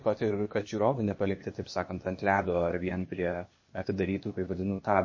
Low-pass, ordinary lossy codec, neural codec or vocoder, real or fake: 7.2 kHz; MP3, 32 kbps; codec, 16 kHz, 0.8 kbps, ZipCodec; fake